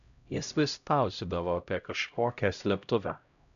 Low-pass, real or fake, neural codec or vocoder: 7.2 kHz; fake; codec, 16 kHz, 0.5 kbps, X-Codec, HuBERT features, trained on LibriSpeech